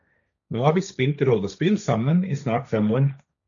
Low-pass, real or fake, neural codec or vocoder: 7.2 kHz; fake; codec, 16 kHz, 1.1 kbps, Voila-Tokenizer